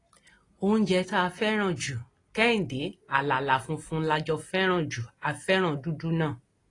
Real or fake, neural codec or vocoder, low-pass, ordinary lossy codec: real; none; 10.8 kHz; AAC, 32 kbps